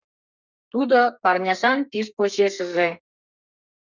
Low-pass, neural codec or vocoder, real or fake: 7.2 kHz; codec, 32 kHz, 1.9 kbps, SNAC; fake